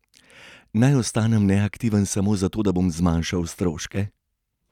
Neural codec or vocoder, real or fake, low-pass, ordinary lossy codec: none; real; 19.8 kHz; none